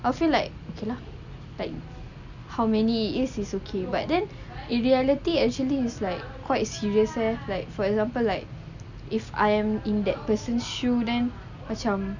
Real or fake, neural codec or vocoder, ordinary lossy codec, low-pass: real; none; none; 7.2 kHz